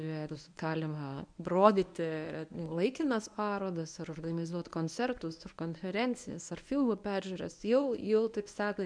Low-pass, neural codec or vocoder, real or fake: 9.9 kHz; codec, 24 kHz, 0.9 kbps, WavTokenizer, medium speech release version 1; fake